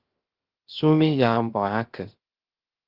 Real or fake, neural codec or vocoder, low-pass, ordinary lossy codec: fake; codec, 16 kHz, 0.3 kbps, FocalCodec; 5.4 kHz; Opus, 16 kbps